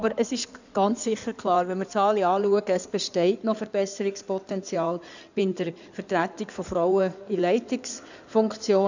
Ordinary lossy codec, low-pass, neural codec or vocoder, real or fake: none; 7.2 kHz; codec, 16 kHz in and 24 kHz out, 2.2 kbps, FireRedTTS-2 codec; fake